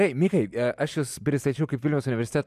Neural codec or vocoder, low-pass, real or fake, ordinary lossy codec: none; 14.4 kHz; real; MP3, 96 kbps